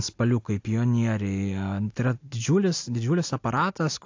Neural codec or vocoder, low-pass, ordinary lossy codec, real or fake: none; 7.2 kHz; AAC, 48 kbps; real